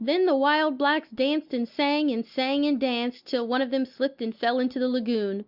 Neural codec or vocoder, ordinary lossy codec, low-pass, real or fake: none; Opus, 64 kbps; 5.4 kHz; real